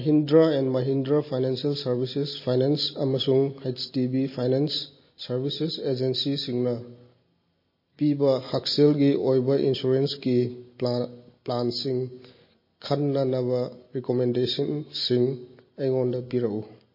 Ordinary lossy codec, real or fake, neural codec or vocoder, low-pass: MP3, 24 kbps; real; none; 5.4 kHz